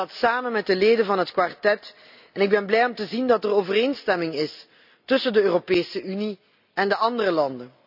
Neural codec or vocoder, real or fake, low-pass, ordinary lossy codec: none; real; 5.4 kHz; none